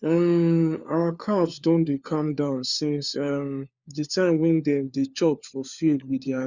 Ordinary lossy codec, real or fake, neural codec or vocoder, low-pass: Opus, 64 kbps; fake; codec, 16 kHz, 4 kbps, FunCodec, trained on LibriTTS, 50 frames a second; 7.2 kHz